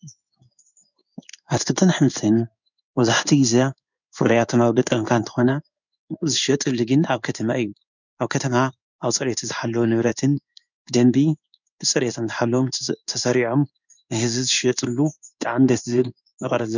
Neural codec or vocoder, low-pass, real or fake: codec, 16 kHz in and 24 kHz out, 1 kbps, XY-Tokenizer; 7.2 kHz; fake